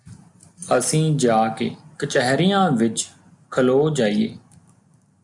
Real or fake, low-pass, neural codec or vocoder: real; 10.8 kHz; none